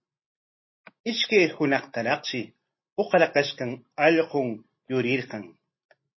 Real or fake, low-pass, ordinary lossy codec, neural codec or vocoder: real; 7.2 kHz; MP3, 24 kbps; none